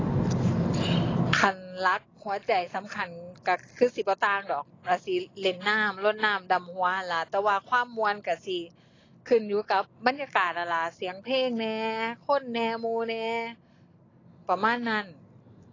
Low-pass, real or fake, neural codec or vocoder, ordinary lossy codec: 7.2 kHz; real; none; AAC, 32 kbps